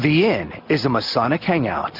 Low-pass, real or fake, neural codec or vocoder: 5.4 kHz; real; none